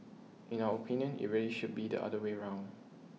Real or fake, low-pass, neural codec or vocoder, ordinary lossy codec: real; none; none; none